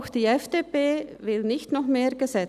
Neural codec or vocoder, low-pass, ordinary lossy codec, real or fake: none; 14.4 kHz; none; real